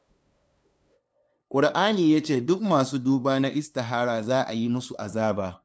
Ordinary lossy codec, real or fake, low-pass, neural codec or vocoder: none; fake; none; codec, 16 kHz, 2 kbps, FunCodec, trained on LibriTTS, 25 frames a second